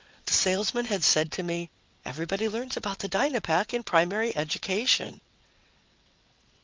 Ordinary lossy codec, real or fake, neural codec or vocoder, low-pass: Opus, 32 kbps; fake; vocoder, 22.05 kHz, 80 mel bands, WaveNeXt; 7.2 kHz